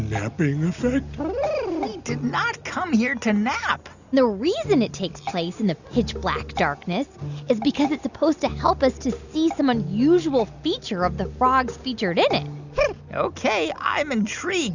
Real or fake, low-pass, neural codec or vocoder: real; 7.2 kHz; none